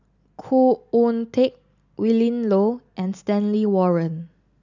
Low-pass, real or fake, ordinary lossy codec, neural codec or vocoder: 7.2 kHz; real; none; none